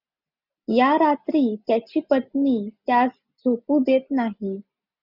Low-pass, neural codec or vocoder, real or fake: 5.4 kHz; none; real